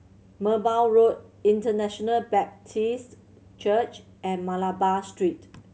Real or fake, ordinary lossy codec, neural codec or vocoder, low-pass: real; none; none; none